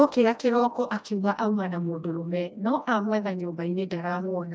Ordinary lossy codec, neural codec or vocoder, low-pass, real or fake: none; codec, 16 kHz, 1 kbps, FreqCodec, smaller model; none; fake